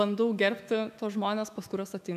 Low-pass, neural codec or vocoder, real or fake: 14.4 kHz; autoencoder, 48 kHz, 128 numbers a frame, DAC-VAE, trained on Japanese speech; fake